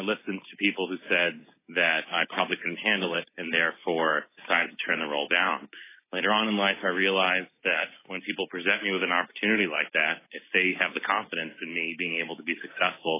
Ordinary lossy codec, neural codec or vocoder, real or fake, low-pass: AAC, 24 kbps; none; real; 3.6 kHz